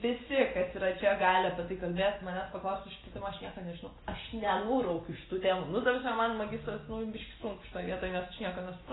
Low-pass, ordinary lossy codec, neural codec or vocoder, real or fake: 7.2 kHz; AAC, 16 kbps; none; real